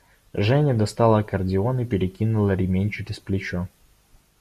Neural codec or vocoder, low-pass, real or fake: none; 14.4 kHz; real